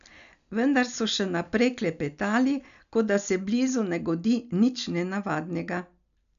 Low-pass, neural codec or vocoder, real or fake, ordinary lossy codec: 7.2 kHz; none; real; none